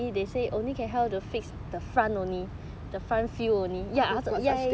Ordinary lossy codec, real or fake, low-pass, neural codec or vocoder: none; real; none; none